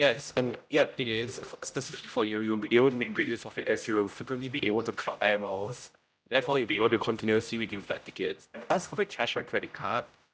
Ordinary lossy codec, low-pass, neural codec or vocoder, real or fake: none; none; codec, 16 kHz, 0.5 kbps, X-Codec, HuBERT features, trained on general audio; fake